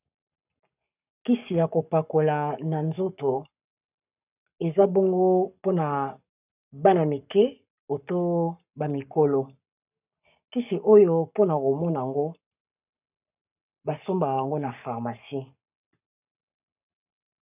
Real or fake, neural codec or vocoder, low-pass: fake; codec, 44.1 kHz, 7.8 kbps, Pupu-Codec; 3.6 kHz